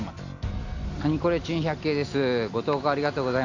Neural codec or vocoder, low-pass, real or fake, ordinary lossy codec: none; 7.2 kHz; real; AAC, 48 kbps